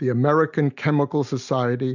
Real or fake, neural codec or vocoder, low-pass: real; none; 7.2 kHz